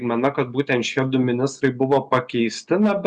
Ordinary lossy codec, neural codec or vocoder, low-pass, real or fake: Opus, 64 kbps; none; 9.9 kHz; real